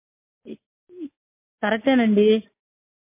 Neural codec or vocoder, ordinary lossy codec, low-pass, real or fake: none; MP3, 24 kbps; 3.6 kHz; real